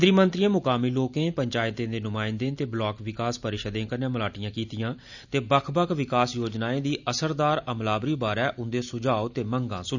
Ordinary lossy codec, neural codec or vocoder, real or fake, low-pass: none; none; real; 7.2 kHz